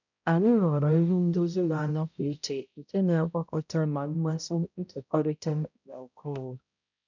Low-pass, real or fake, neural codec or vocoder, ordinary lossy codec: 7.2 kHz; fake; codec, 16 kHz, 0.5 kbps, X-Codec, HuBERT features, trained on balanced general audio; none